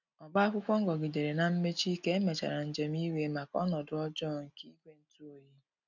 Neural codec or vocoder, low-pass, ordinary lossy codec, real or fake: none; 7.2 kHz; none; real